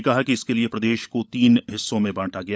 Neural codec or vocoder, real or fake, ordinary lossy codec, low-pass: codec, 16 kHz, 16 kbps, FunCodec, trained on LibriTTS, 50 frames a second; fake; none; none